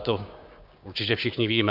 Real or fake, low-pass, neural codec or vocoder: fake; 5.4 kHz; codec, 16 kHz, 6 kbps, DAC